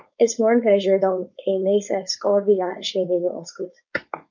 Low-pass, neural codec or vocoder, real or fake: 7.2 kHz; codec, 16 kHz, 4.8 kbps, FACodec; fake